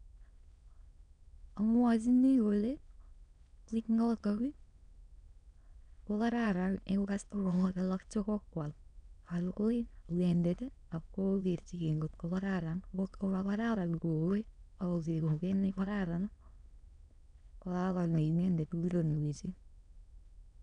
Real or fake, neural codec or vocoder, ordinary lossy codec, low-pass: fake; autoencoder, 22.05 kHz, a latent of 192 numbers a frame, VITS, trained on many speakers; none; 9.9 kHz